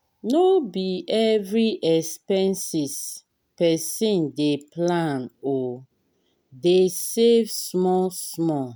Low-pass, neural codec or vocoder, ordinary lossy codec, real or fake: none; none; none; real